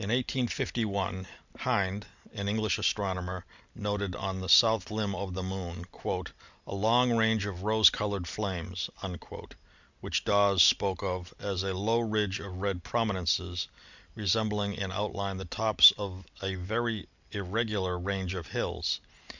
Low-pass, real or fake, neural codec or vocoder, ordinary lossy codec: 7.2 kHz; real; none; Opus, 64 kbps